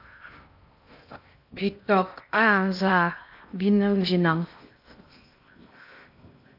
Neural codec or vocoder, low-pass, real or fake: codec, 16 kHz in and 24 kHz out, 0.6 kbps, FocalCodec, streaming, 2048 codes; 5.4 kHz; fake